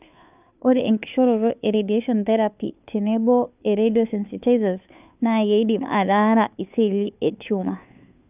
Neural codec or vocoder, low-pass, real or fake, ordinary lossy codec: codec, 16 kHz, 2 kbps, FunCodec, trained on Chinese and English, 25 frames a second; 3.6 kHz; fake; none